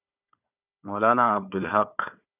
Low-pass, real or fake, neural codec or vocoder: 3.6 kHz; fake; codec, 16 kHz, 4 kbps, FunCodec, trained on Chinese and English, 50 frames a second